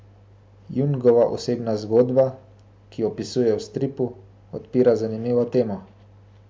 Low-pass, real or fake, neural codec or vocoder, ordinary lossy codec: none; real; none; none